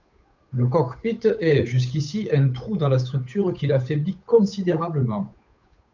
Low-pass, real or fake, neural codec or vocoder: 7.2 kHz; fake; codec, 16 kHz, 8 kbps, FunCodec, trained on Chinese and English, 25 frames a second